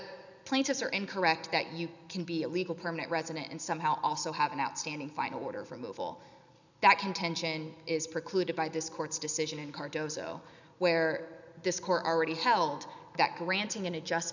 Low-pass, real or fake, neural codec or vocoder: 7.2 kHz; real; none